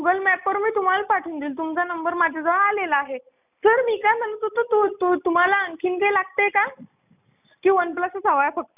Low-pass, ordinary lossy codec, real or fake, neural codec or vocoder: 3.6 kHz; none; real; none